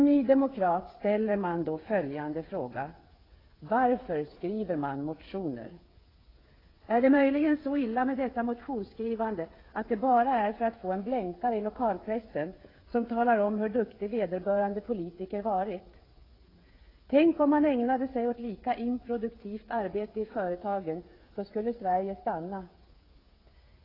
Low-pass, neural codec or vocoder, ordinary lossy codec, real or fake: 5.4 kHz; codec, 16 kHz, 8 kbps, FreqCodec, smaller model; AAC, 24 kbps; fake